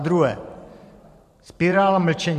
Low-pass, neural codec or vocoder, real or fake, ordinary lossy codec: 14.4 kHz; vocoder, 44.1 kHz, 128 mel bands every 512 samples, BigVGAN v2; fake; MP3, 64 kbps